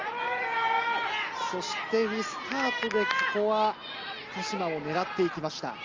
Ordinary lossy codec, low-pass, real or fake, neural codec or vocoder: Opus, 32 kbps; 7.2 kHz; real; none